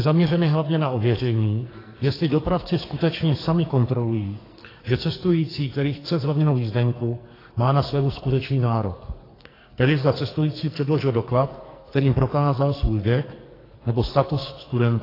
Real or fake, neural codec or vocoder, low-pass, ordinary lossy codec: fake; codec, 44.1 kHz, 2.6 kbps, SNAC; 5.4 kHz; AAC, 24 kbps